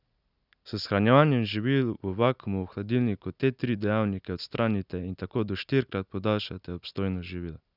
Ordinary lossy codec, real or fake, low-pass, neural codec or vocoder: none; real; 5.4 kHz; none